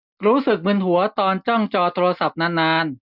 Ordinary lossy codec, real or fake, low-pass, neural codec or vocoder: none; real; 5.4 kHz; none